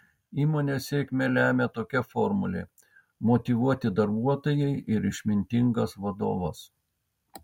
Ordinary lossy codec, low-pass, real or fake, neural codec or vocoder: MP3, 64 kbps; 19.8 kHz; real; none